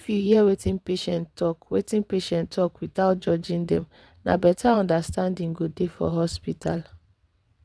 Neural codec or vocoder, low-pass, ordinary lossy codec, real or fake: vocoder, 22.05 kHz, 80 mel bands, WaveNeXt; none; none; fake